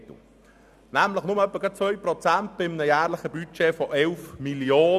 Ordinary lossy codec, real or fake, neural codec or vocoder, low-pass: none; real; none; 14.4 kHz